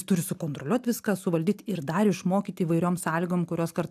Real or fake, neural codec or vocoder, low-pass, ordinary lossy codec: real; none; 14.4 kHz; AAC, 96 kbps